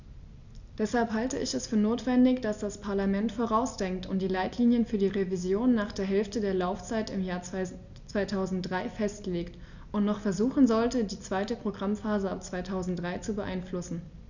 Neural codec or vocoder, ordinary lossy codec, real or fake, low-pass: none; none; real; 7.2 kHz